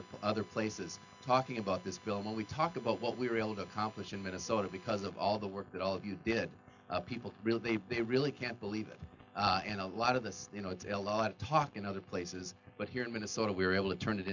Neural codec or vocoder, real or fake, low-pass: none; real; 7.2 kHz